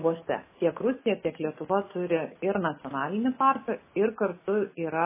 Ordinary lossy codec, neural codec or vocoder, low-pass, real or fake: MP3, 16 kbps; none; 3.6 kHz; real